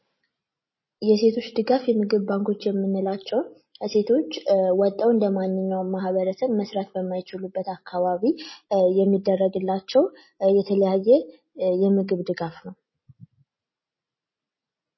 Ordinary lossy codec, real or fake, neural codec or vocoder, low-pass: MP3, 24 kbps; real; none; 7.2 kHz